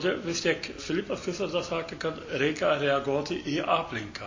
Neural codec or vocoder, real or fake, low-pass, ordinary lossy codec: none; real; 7.2 kHz; MP3, 32 kbps